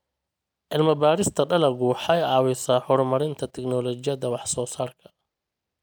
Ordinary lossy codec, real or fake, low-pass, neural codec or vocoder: none; real; none; none